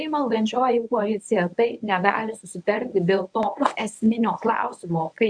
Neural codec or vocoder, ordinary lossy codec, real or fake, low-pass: codec, 24 kHz, 0.9 kbps, WavTokenizer, medium speech release version 1; AAC, 64 kbps; fake; 9.9 kHz